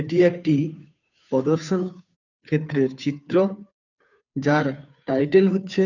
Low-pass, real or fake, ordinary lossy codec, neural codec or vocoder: 7.2 kHz; fake; none; codec, 16 kHz, 2 kbps, FunCodec, trained on Chinese and English, 25 frames a second